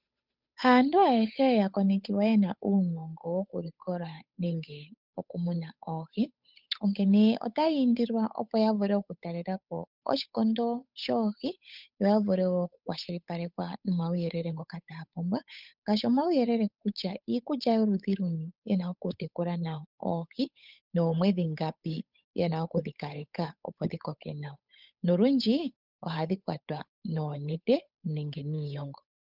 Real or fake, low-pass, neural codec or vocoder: fake; 5.4 kHz; codec, 16 kHz, 8 kbps, FunCodec, trained on Chinese and English, 25 frames a second